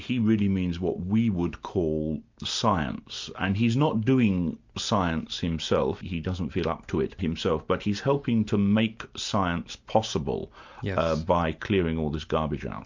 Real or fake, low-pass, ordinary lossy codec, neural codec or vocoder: real; 7.2 kHz; MP3, 64 kbps; none